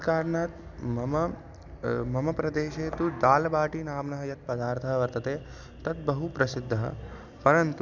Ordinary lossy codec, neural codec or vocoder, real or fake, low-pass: none; none; real; 7.2 kHz